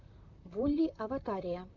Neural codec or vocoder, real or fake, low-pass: vocoder, 44.1 kHz, 128 mel bands, Pupu-Vocoder; fake; 7.2 kHz